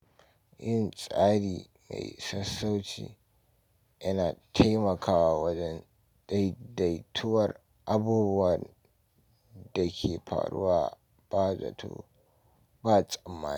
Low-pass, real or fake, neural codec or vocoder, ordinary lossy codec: 19.8 kHz; real; none; none